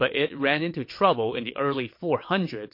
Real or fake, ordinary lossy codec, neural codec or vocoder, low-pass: fake; MP3, 32 kbps; vocoder, 22.05 kHz, 80 mel bands, WaveNeXt; 5.4 kHz